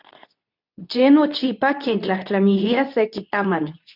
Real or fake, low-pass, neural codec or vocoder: fake; 5.4 kHz; codec, 24 kHz, 0.9 kbps, WavTokenizer, medium speech release version 2